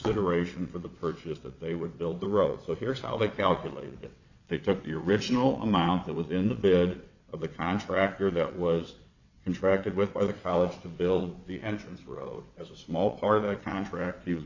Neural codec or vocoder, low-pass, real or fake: vocoder, 22.05 kHz, 80 mel bands, WaveNeXt; 7.2 kHz; fake